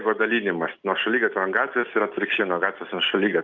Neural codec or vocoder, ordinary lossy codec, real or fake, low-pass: none; Opus, 32 kbps; real; 7.2 kHz